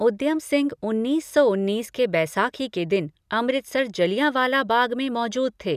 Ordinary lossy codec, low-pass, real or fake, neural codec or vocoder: none; 14.4 kHz; real; none